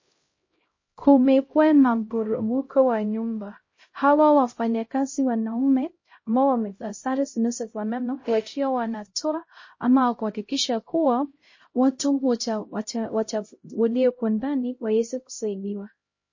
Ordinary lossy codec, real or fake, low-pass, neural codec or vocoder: MP3, 32 kbps; fake; 7.2 kHz; codec, 16 kHz, 0.5 kbps, X-Codec, HuBERT features, trained on LibriSpeech